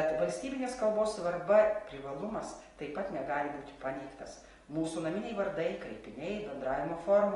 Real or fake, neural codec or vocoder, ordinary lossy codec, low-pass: real; none; AAC, 32 kbps; 10.8 kHz